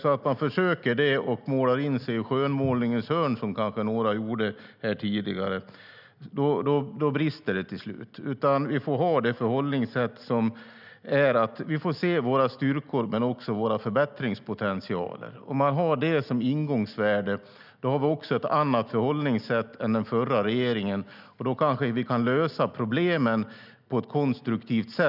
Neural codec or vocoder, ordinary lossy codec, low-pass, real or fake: none; none; 5.4 kHz; real